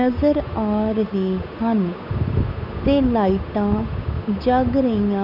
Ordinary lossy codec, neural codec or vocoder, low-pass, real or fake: none; codec, 16 kHz, 8 kbps, FunCodec, trained on Chinese and English, 25 frames a second; 5.4 kHz; fake